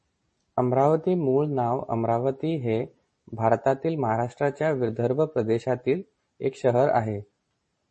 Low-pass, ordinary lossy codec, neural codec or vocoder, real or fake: 10.8 kHz; MP3, 32 kbps; none; real